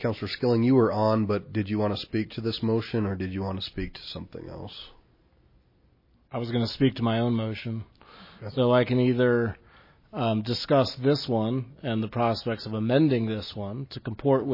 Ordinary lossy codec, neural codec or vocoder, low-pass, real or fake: MP3, 24 kbps; none; 5.4 kHz; real